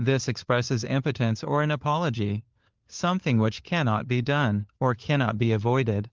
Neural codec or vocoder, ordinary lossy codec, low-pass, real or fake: codec, 16 kHz, 4 kbps, FunCodec, trained on LibriTTS, 50 frames a second; Opus, 32 kbps; 7.2 kHz; fake